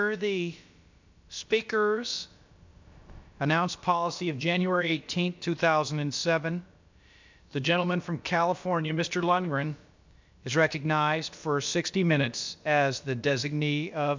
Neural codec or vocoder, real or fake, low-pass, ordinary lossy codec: codec, 16 kHz, about 1 kbps, DyCAST, with the encoder's durations; fake; 7.2 kHz; MP3, 64 kbps